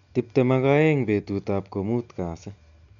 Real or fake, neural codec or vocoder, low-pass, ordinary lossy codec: real; none; 7.2 kHz; none